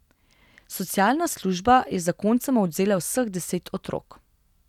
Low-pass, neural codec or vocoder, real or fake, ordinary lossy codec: 19.8 kHz; none; real; none